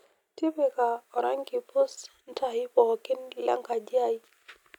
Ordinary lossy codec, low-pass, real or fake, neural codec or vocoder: none; 19.8 kHz; real; none